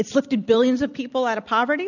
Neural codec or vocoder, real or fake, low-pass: none; real; 7.2 kHz